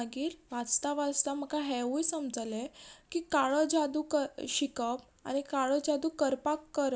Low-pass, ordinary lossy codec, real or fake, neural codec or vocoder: none; none; real; none